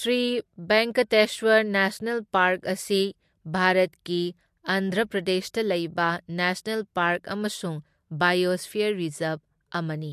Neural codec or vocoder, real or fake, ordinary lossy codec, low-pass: none; real; MP3, 64 kbps; 14.4 kHz